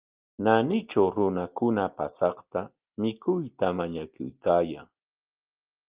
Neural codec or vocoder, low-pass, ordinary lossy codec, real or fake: none; 3.6 kHz; Opus, 32 kbps; real